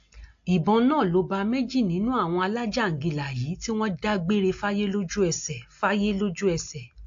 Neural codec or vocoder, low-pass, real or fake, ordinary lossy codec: none; 7.2 kHz; real; AAC, 48 kbps